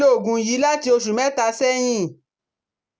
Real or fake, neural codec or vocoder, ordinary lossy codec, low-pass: real; none; none; none